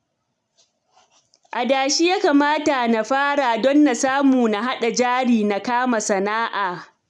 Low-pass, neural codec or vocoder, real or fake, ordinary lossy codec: 10.8 kHz; none; real; none